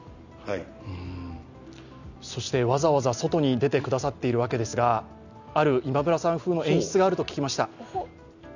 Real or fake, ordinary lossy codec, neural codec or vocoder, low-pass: real; none; none; 7.2 kHz